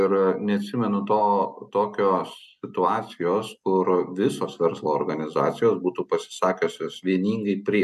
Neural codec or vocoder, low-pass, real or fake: none; 14.4 kHz; real